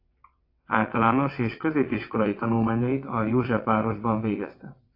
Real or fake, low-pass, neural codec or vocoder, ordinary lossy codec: fake; 5.4 kHz; vocoder, 22.05 kHz, 80 mel bands, WaveNeXt; AAC, 32 kbps